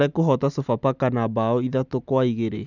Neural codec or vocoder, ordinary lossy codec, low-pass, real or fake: none; none; 7.2 kHz; real